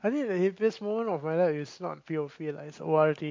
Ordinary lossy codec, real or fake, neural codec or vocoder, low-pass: MP3, 48 kbps; real; none; 7.2 kHz